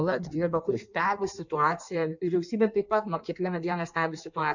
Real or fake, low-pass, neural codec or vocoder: fake; 7.2 kHz; codec, 16 kHz in and 24 kHz out, 1.1 kbps, FireRedTTS-2 codec